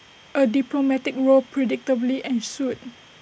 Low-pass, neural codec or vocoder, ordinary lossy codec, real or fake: none; none; none; real